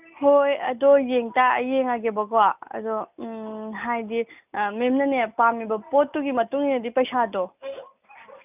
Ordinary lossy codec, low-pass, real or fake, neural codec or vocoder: none; 3.6 kHz; real; none